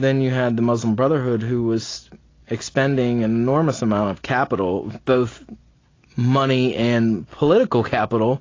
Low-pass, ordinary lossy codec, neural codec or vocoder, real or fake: 7.2 kHz; AAC, 32 kbps; none; real